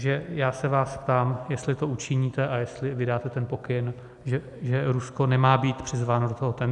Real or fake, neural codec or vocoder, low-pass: real; none; 10.8 kHz